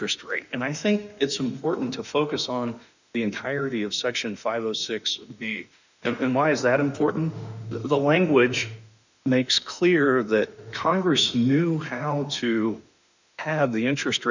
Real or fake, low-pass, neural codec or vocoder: fake; 7.2 kHz; autoencoder, 48 kHz, 32 numbers a frame, DAC-VAE, trained on Japanese speech